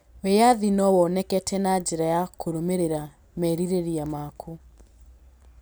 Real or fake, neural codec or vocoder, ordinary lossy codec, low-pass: real; none; none; none